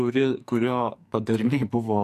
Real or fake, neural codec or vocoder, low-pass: fake; codec, 44.1 kHz, 2.6 kbps, SNAC; 14.4 kHz